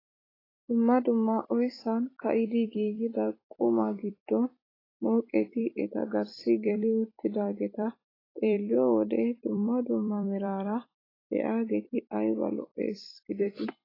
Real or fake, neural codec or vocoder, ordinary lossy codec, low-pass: fake; vocoder, 44.1 kHz, 128 mel bands every 256 samples, BigVGAN v2; AAC, 24 kbps; 5.4 kHz